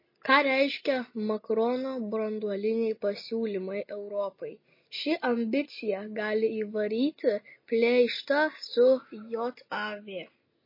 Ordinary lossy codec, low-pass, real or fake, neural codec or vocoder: MP3, 24 kbps; 5.4 kHz; real; none